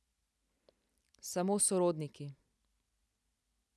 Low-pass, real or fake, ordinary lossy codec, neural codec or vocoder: none; real; none; none